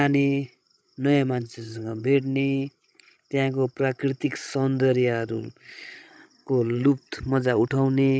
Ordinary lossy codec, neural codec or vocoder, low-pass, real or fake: none; codec, 16 kHz, 16 kbps, FreqCodec, larger model; none; fake